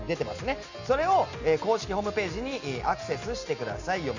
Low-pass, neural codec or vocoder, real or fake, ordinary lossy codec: 7.2 kHz; none; real; none